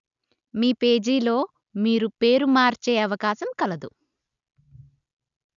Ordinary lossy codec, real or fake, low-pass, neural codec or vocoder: none; real; 7.2 kHz; none